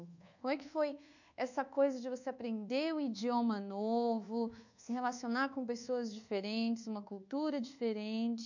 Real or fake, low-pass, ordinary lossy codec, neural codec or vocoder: fake; 7.2 kHz; none; codec, 24 kHz, 1.2 kbps, DualCodec